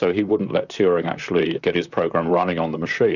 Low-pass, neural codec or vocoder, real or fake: 7.2 kHz; vocoder, 44.1 kHz, 128 mel bands, Pupu-Vocoder; fake